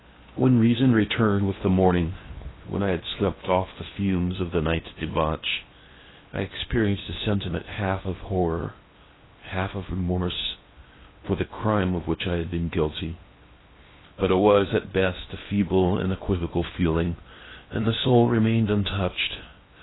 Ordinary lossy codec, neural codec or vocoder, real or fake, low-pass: AAC, 16 kbps; codec, 16 kHz in and 24 kHz out, 0.8 kbps, FocalCodec, streaming, 65536 codes; fake; 7.2 kHz